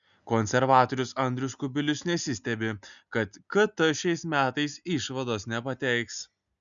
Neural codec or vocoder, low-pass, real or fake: none; 7.2 kHz; real